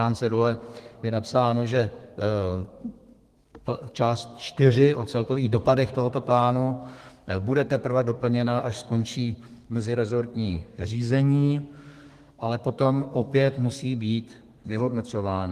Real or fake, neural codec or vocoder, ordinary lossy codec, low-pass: fake; codec, 32 kHz, 1.9 kbps, SNAC; Opus, 24 kbps; 14.4 kHz